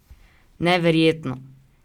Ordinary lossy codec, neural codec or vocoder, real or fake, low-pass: Opus, 64 kbps; none; real; 19.8 kHz